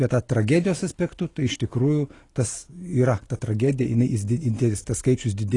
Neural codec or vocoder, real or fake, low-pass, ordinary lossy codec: none; real; 10.8 kHz; AAC, 32 kbps